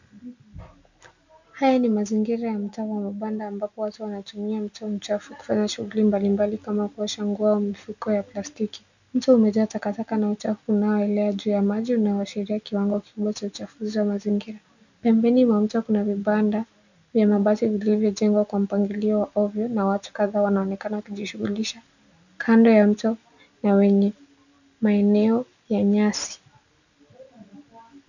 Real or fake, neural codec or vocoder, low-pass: real; none; 7.2 kHz